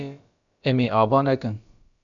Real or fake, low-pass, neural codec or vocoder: fake; 7.2 kHz; codec, 16 kHz, about 1 kbps, DyCAST, with the encoder's durations